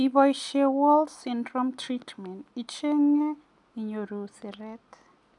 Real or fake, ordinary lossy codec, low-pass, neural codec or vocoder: real; none; 10.8 kHz; none